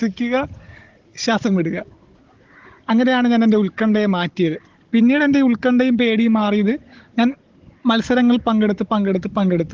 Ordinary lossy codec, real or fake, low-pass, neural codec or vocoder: Opus, 16 kbps; fake; 7.2 kHz; codec, 16 kHz, 16 kbps, FunCodec, trained on Chinese and English, 50 frames a second